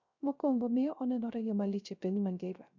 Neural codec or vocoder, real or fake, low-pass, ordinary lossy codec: codec, 16 kHz, 0.3 kbps, FocalCodec; fake; 7.2 kHz; none